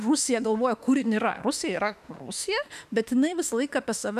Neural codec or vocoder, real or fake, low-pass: autoencoder, 48 kHz, 32 numbers a frame, DAC-VAE, trained on Japanese speech; fake; 14.4 kHz